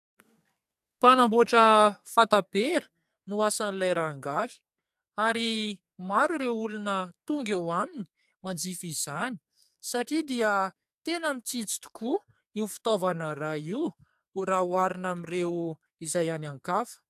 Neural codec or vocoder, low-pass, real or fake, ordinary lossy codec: codec, 44.1 kHz, 2.6 kbps, SNAC; 14.4 kHz; fake; AAC, 96 kbps